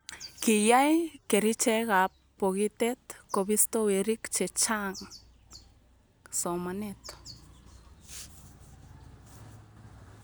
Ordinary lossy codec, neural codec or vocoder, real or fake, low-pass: none; none; real; none